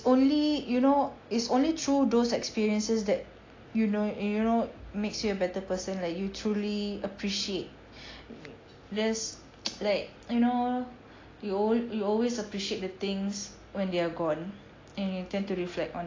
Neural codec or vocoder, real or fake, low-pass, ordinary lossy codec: none; real; 7.2 kHz; AAC, 32 kbps